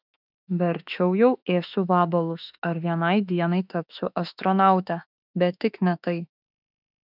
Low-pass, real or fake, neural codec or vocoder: 5.4 kHz; fake; autoencoder, 48 kHz, 32 numbers a frame, DAC-VAE, trained on Japanese speech